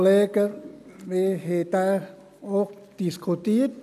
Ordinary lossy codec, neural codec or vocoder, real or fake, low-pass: none; none; real; 14.4 kHz